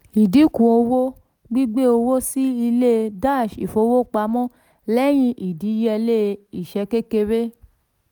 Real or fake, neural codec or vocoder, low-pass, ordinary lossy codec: real; none; none; none